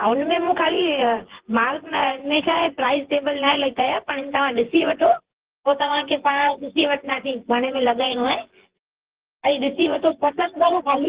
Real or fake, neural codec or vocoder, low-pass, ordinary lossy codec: fake; vocoder, 24 kHz, 100 mel bands, Vocos; 3.6 kHz; Opus, 16 kbps